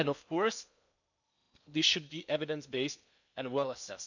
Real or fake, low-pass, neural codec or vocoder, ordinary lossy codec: fake; 7.2 kHz; codec, 16 kHz in and 24 kHz out, 0.8 kbps, FocalCodec, streaming, 65536 codes; none